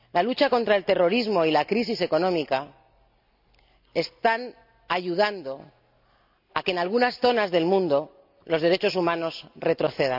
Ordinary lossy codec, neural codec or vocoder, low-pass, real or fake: none; none; 5.4 kHz; real